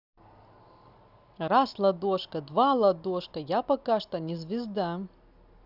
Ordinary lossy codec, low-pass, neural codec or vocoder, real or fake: Opus, 64 kbps; 5.4 kHz; none; real